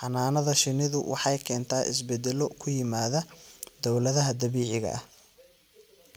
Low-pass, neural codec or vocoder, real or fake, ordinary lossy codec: none; none; real; none